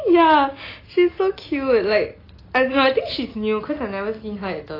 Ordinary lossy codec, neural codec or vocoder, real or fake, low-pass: AAC, 24 kbps; none; real; 5.4 kHz